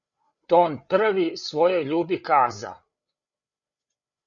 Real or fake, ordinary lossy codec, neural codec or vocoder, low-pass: fake; Opus, 64 kbps; codec, 16 kHz, 8 kbps, FreqCodec, larger model; 7.2 kHz